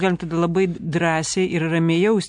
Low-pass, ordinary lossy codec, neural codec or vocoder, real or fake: 9.9 kHz; MP3, 64 kbps; none; real